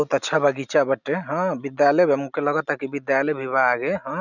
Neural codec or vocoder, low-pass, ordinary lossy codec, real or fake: none; 7.2 kHz; none; real